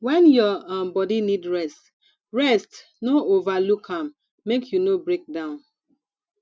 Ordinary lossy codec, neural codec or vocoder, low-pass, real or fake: none; none; none; real